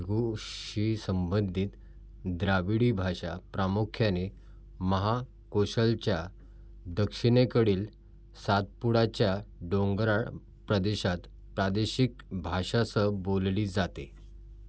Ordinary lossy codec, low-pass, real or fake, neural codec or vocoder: none; none; real; none